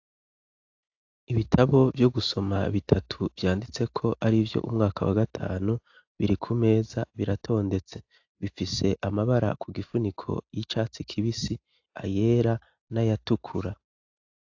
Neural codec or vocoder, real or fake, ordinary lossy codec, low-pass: none; real; AAC, 48 kbps; 7.2 kHz